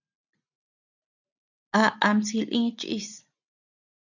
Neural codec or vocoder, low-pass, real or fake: none; 7.2 kHz; real